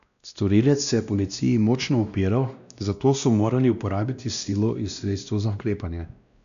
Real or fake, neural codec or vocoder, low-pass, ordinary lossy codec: fake; codec, 16 kHz, 1 kbps, X-Codec, WavLM features, trained on Multilingual LibriSpeech; 7.2 kHz; none